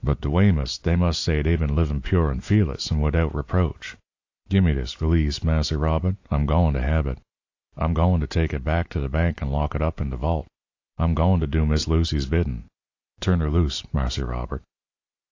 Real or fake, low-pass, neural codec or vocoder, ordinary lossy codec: real; 7.2 kHz; none; AAC, 48 kbps